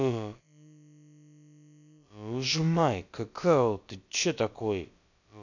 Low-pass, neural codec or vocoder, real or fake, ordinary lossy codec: 7.2 kHz; codec, 16 kHz, about 1 kbps, DyCAST, with the encoder's durations; fake; none